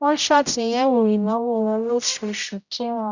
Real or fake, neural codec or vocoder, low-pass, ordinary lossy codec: fake; codec, 16 kHz, 0.5 kbps, X-Codec, HuBERT features, trained on general audio; 7.2 kHz; none